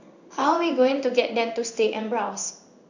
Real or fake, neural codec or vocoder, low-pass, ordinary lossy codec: fake; codec, 16 kHz in and 24 kHz out, 1 kbps, XY-Tokenizer; 7.2 kHz; none